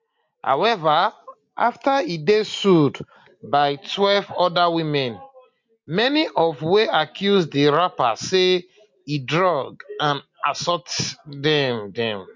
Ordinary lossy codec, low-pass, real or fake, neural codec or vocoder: MP3, 48 kbps; 7.2 kHz; real; none